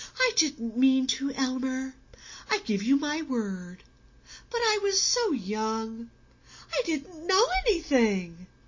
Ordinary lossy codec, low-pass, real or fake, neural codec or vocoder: MP3, 32 kbps; 7.2 kHz; real; none